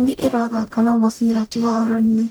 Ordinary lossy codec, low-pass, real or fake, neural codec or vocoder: none; none; fake; codec, 44.1 kHz, 0.9 kbps, DAC